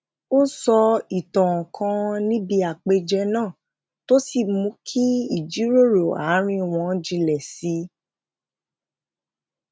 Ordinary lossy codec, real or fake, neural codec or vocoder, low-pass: none; real; none; none